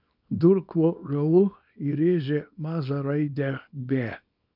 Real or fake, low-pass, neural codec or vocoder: fake; 5.4 kHz; codec, 24 kHz, 0.9 kbps, WavTokenizer, small release